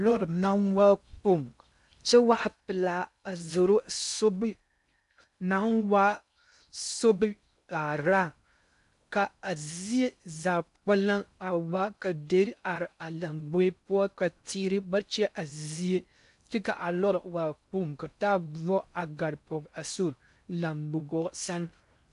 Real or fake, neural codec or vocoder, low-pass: fake; codec, 16 kHz in and 24 kHz out, 0.6 kbps, FocalCodec, streaming, 2048 codes; 10.8 kHz